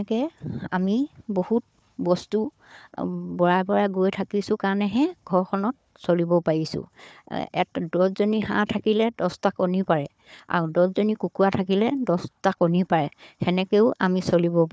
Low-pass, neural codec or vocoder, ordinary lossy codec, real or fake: none; codec, 16 kHz, 4 kbps, FunCodec, trained on Chinese and English, 50 frames a second; none; fake